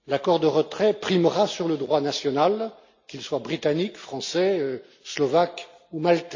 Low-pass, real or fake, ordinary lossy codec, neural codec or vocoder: 7.2 kHz; real; MP3, 48 kbps; none